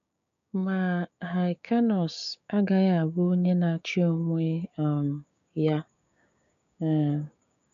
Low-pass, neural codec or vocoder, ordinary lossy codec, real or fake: 7.2 kHz; codec, 16 kHz, 6 kbps, DAC; none; fake